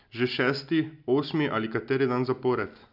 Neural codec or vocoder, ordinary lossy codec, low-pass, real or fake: none; none; 5.4 kHz; real